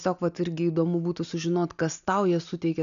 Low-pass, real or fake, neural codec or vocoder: 7.2 kHz; real; none